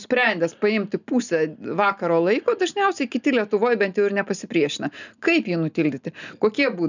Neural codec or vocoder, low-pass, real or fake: none; 7.2 kHz; real